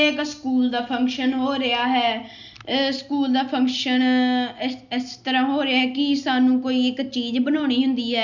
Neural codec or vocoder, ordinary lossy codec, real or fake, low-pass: none; MP3, 64 kbps; real; 7.2 kHz